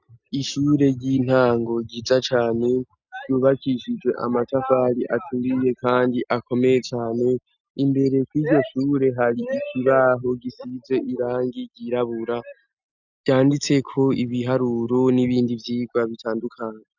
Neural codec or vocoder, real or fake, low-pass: none; real; 7.2 kHz